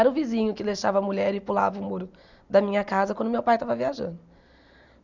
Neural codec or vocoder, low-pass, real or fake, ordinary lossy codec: none; 7.2 kHz; real; none